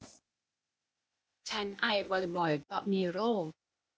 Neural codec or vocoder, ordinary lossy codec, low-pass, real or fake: codec, 16 kHz, 0.8 kbps, ZipCodec; none; none; fake